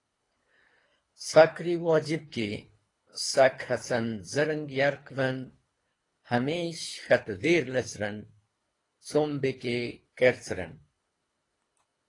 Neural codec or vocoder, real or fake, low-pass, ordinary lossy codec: codec, 24 kHz, 3 kbps, HILCodec; fake; 10.8 kHz; AAC, 32 kbps